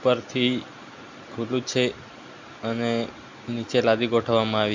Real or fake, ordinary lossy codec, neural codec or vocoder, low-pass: real; MP3, 64 kbps; none; 7.2 kHz